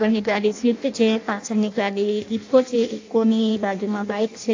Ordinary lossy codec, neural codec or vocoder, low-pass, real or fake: AAC, 48 kbps; codec, 16 kHz in and 24 kHz out, 0.6 kbps, FireRedTTS-2 codec; 7.2 kHz; fake